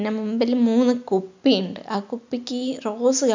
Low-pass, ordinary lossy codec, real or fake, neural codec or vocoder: 7.2 kHz; MP3, 64 kbps; real; none